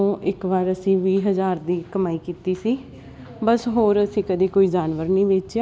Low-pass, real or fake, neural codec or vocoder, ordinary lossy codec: none; real; none; none